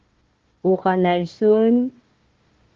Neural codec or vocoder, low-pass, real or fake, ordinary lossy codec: codec, 16 kHz, 1 kbps, FunCodec, trained on Chinese and English, 50 frames a second; 7.2 kHz; fake; Opus, 16 kbps